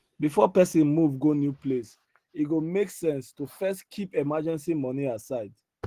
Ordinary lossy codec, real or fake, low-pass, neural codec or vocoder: Opus, 16 kbps; real; 14.4 kHz; none